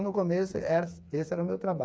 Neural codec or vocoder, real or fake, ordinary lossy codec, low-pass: codec, 16 kHz, 4 kbps, FreqCodec, smaller model; fake; none; none